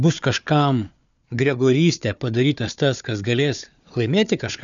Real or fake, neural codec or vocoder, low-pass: fake; codec, 16 kHz, 4 kbps, FunCodec, trained on Chinese and English, 50 frames a second; 7.2 kHz